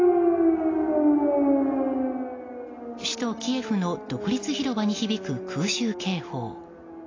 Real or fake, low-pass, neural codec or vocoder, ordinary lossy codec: real; 7.2 kHz; none; AAC, 32 kbps